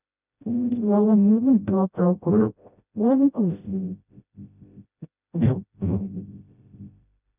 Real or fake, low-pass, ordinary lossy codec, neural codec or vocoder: fake; 3.6 kHz; none; codec, 16 kHz, 0.5 kbps, FreqCodec, smaller model